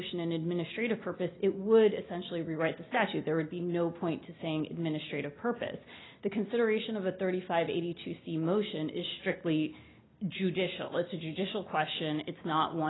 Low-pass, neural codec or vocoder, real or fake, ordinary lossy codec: 7.2 kHz; none; real; AAC, 16 kbps